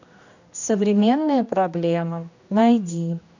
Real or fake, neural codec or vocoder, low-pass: fake; codec, 16 kHz, 1 kbps, X-Codec, HuBERT features, trained on general audio; 7.2 kHz